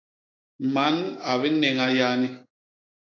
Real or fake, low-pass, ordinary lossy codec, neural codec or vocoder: fake; 7.2 kHz; AAC, 32 kbps; codec, 16 kHz, 6 kbps, DAC